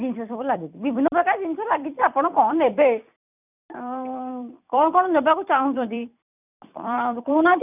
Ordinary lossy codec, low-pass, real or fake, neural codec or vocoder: none; 3.6 kHz; fake; vocoder, 44.1 kHz, 128 mel bands every 256 samples, BigVGAN v2